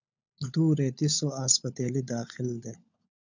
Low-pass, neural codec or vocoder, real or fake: 7.2 kHz; codec, 16 kHz, 16 kbps, FunCodec, trained on LibriTTS, 50 frames a second; fake